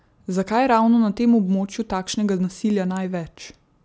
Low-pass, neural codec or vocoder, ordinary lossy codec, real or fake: none; none; none; real